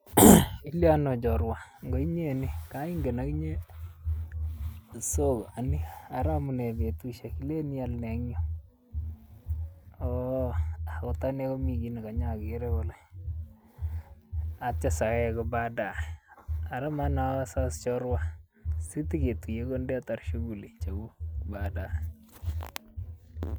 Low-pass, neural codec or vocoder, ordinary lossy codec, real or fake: none; none; none; real